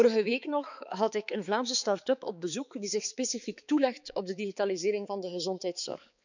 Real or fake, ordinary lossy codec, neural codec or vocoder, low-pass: fake; none; codec, 16 kHz, 4 kbps, X-Codec, HuBERT features, trained on balanced general audio; 7.2 kHz